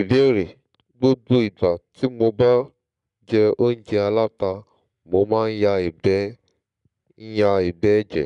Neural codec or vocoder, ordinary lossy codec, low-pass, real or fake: codec, 44.1 kHz, 7.8 kbps, Pupu-Codec; none; 10.8 kHz; fake